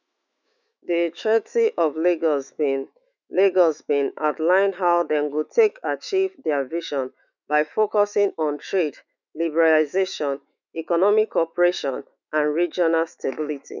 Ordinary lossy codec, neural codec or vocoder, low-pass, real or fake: none; autoencoder, 48 kHz, 128 numbers a frame, DAC-VAE, trained on Japanese speech; 7.2 kHz; fake